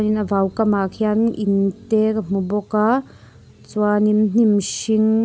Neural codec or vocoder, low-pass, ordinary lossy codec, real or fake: none; none; none; real